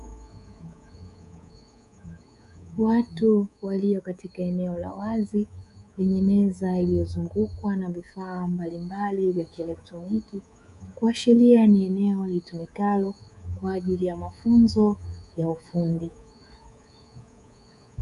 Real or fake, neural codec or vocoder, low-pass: fake; codec, 24 kHz, 3.1 kbps, DualCodec; 10.8 kHz